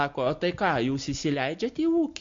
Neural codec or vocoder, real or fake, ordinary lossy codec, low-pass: none; real; MP3, 48 kbps; 7.2 kHz